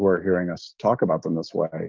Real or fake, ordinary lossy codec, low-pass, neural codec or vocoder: fake; Opus, 24 kbps; 7.2 kHz; codec, 44.1 kHz, 7.8 kbps, DAC